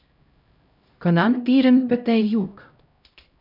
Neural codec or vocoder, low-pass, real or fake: codec, 16 kHz, 0.5 kbps, X-Codec, HuBERT features, trained on LibriSpeech; 5.4 kHz; fake